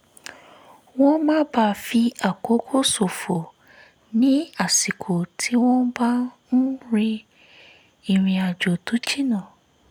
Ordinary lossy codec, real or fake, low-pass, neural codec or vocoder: none; real; none; none